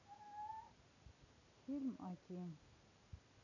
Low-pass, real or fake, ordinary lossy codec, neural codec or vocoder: 7.2 kHz; real; none; none